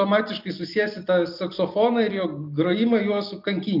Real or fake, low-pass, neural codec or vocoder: real; 5.4 kHz; none